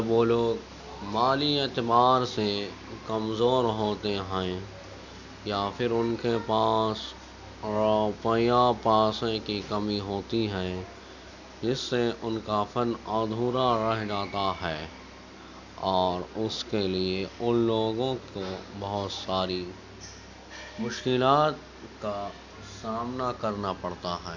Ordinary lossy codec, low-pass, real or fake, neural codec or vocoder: none; 7.2 kHz; real; none